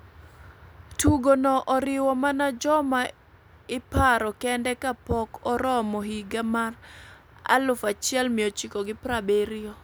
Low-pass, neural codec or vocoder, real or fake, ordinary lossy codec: none; none; real; none